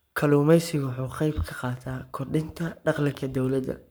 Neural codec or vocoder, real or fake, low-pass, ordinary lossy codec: codec, 44.1 kHz, 7.8 kbps, Pupu-Codec; fake; none; none